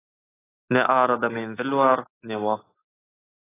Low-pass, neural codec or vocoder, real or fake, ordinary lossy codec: 3.6 kHz; none; real; AAC, 16 kbps